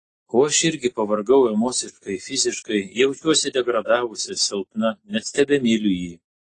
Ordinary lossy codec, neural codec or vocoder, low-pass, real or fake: AAC, 32 kbps; none; 10.8 kHz; real